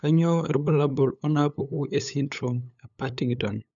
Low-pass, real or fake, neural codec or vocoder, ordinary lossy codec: 7.2 kHz; fake; codec, 16 kHz, 8 kbps, FunCodec, trained on LibriTTS, 25 frames a second; MP3, 96 kbps